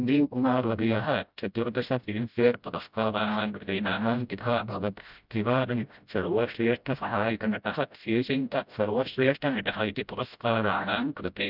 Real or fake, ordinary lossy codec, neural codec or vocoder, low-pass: fake; none; codec, 16 kHz, 0.5 kbps, FreqCodec, smaller model; 5.4 kHz